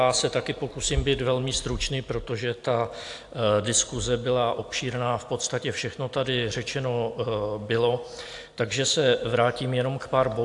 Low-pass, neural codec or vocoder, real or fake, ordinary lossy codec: 10.8 kHz; none; real; AAC, 64 kbps